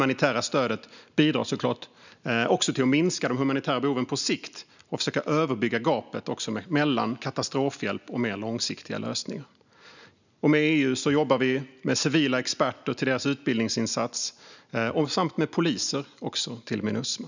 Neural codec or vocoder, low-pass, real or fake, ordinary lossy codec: none; 7.2 kHz; real; none